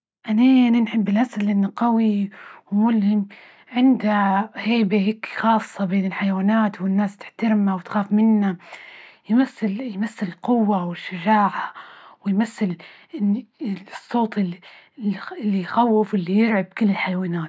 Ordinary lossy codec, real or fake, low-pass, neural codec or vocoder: none; real; none; none